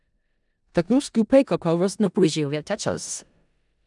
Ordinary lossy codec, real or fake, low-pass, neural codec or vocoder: none; fake; 10.8 kHz; codec, 16 kHz in and 24 kHz out, 0.4 kbps, LongCat-Audio-Codec, four codebook decoder